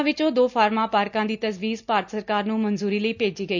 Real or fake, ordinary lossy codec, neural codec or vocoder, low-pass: real; none; none; 7.2 kHz